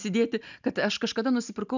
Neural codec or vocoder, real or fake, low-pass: none; real; 7.2 kHz